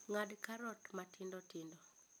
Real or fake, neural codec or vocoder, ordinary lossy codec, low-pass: real; none; none; none